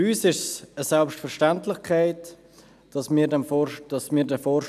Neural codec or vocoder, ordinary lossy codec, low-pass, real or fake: none; none; 14.4 kHz; real